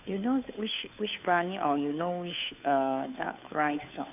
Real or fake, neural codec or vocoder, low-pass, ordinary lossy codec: fake; codec, 16 kHz, 2 kbps, FunCodec, trained on Chinese and English, 25 frames a second; 3.6 kHz; none